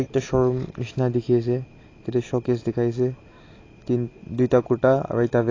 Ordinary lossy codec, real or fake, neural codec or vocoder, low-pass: AAC, 32 kbps; real; none; 7.2 kHz